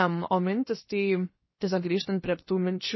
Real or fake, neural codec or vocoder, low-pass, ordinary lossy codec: fake; codec, 16 kHz, about 1 kbps, DyCAST, with the encoder's durations; 7.2 kHz; MP3, 24 kbps